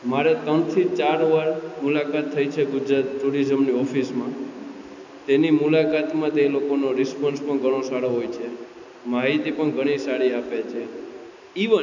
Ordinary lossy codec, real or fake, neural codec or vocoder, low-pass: none; real; none; 7.2 kHz